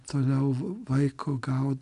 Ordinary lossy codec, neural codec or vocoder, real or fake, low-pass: AAC, 96 kbps; none; real; 10.8 kHz